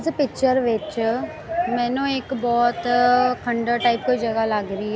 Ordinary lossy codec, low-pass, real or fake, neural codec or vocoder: none; none; real; none